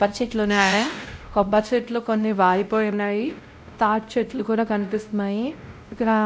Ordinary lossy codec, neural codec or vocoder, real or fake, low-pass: none; codec, 16 kHz, 0.5 kbps, X-Codec, WavLM features, trained on Multilingual LibriSpeech; fake; none